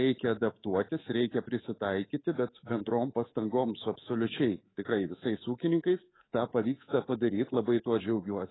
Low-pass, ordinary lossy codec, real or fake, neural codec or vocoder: 7.2 kHz; AAC, 16 kbps; fake; codec, 24 kHz, 3.1 kbps, DualCodec